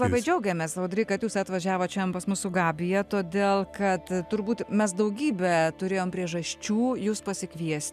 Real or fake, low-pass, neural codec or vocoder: real; 14.4 kHz; none